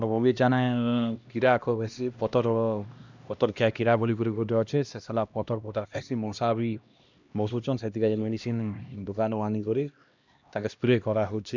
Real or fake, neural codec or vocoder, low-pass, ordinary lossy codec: fake; codec, 16 kHz, 1 kbps, X-Codec, HuBERT features, trained on LibriSpeech; 7.2 kHz; none